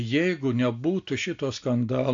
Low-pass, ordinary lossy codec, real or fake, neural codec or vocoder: 7.2 kHz; AAC, 48 kbps; real; none